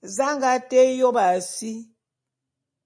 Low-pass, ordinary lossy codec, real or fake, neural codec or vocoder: 9.9 kHz; MP3, 48 kbps; real; none